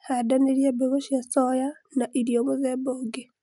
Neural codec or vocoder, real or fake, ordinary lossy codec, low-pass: none; real; none; 10.8 kHz